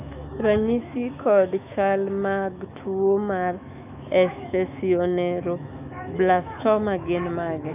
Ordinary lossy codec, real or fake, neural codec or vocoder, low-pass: AAC, 32 kbps; fake; autoencoder, 48 kHz, 128 numbers a frame, DAC-VAE, trained on Japanese speech; 3.6 kHz